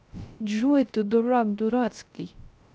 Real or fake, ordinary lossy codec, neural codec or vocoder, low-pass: fake; none; codec, 16 kHz, 0.3 kbps, FocalCodec; none